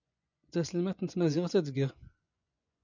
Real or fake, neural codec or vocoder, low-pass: fake; vocoder, 24 kHz, 100 mel bands, Vocos; 7.2 kHz